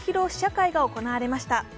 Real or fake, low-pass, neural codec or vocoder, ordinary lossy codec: real; none; none; none